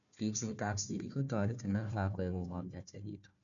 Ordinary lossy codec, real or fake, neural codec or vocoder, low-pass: none; fake; codec, 16 kHz, 1 kbps, FunCodec, trained on Chinese and English, 50 frames a second; 7.2 kHz